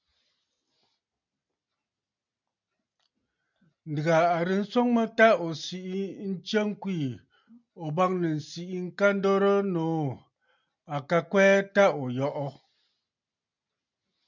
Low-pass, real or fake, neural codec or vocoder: 7.2 kHz; real; none